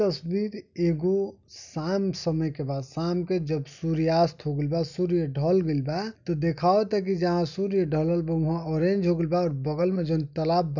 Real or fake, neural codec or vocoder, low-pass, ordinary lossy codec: real; none; 7.2 kHz; none